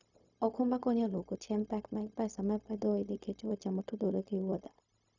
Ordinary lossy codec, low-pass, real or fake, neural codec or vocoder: none; 7.2 kHz; fake; codec, 16 kHz, 0.4 kbps, LongCat-Audio-Codec